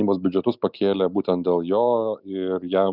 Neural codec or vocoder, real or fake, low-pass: none; real; 5.4 kHz